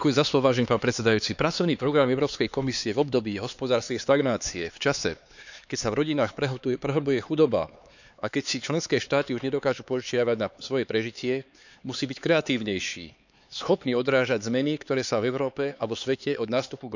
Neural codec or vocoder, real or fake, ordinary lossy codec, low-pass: codec, 16 kHz, 4 kbps, X-Codec, HuBERT features, trained on LibriSpeech; fake; none; 7.2 kHz